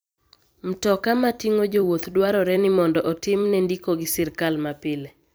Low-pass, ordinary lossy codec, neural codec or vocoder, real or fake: none; none; vocoder, 44.1 kHz, 128 mel bands every 256 samples, BigVGAN v2; fake